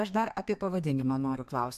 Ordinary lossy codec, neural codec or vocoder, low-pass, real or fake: AAC, 96 kbps; codec, 32 kHz, 1.9 kbps, SNAC; 14.4 kHz; fake